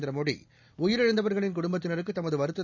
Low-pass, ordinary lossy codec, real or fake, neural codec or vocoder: 7.2 kHz; none; real; none